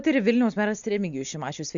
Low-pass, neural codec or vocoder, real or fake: 7.2 kHz; none; real